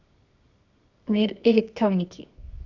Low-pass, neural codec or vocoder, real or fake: 7.2 kHz; codec, 24 kHz, 0.9 kbps, WavTokenizer, medium music audio release; fake